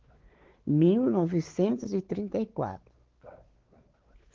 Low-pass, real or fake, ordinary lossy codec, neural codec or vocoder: 7.2 kHz; fake; Opus, 16 kbps; codec, 16 kHz, 4 kbps, X-Codec, WavLM features, trained on Multilingual LibriSpeech